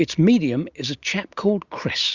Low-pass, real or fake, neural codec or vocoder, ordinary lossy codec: 7.2 kHz; real; none; Opus, 64 kbps